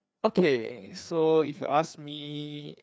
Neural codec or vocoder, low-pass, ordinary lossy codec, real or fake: codec, 16 kHz, 2 kbps, FreqCodec, larger model; none; none; fake